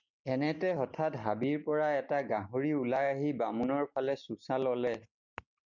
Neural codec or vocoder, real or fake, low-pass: none; real; 7.2 kHz